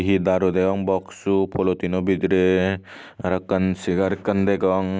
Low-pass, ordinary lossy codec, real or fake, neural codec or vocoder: none; none; real; none